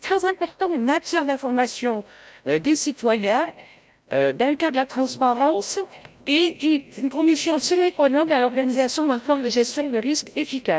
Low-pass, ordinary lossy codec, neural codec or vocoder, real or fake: none; none; codec, 16 kHz, 0.5 kbps, FreqCodec, larger model; fake